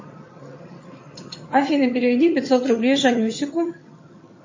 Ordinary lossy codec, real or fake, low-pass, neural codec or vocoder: MP3, 32 kbps; fake; 7.2 kHz; vocoder, 22.05 kHz, 80 mel bands, HiFi-GAN